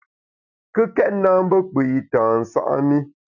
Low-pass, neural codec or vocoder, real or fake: 7.2 kHz; none; real